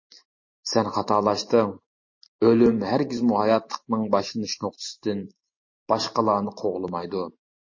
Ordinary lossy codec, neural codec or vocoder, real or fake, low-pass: MP3, 32 kbps; none; real; 7.2 kHz